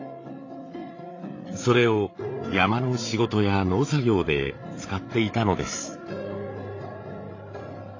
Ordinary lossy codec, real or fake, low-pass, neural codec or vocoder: AAC, 32 kbps; fake; 7.2 kHz; codec, 16 kHz, 8 kbps, FreqCodec, larger model